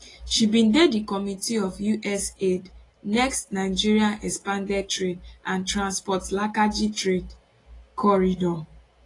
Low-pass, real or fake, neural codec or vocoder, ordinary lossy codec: 10.8 kHz; real; none; AAC, 32 kbps